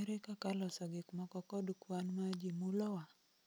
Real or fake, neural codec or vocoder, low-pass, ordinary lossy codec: real; none; none; none